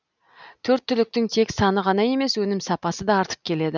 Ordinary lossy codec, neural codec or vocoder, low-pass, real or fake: none; none; 7.2 kHz; real